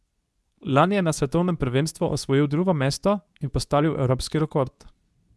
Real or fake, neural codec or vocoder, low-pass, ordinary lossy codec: fake; codec, 24 kHz, 0.9 kbps, WavTokenizer, medium speech release version 2; none; none